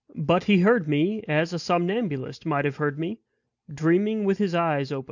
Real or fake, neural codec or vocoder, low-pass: real; none; 7.2 kHz